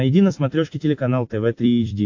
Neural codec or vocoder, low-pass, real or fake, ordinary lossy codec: vocoder, 44.1 kHz, 128 mel bands every 256 samples, BigVGAN v2; 7.2 kHz; fake; AAC, 48 kbps